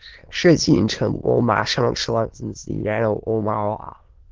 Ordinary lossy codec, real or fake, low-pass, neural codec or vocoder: Opus, 16 kbps; fake; 7.2 kHz; autoencoder, 22.05 kHz, a latent of 192 numbers a frame, VITS, trained on many speakers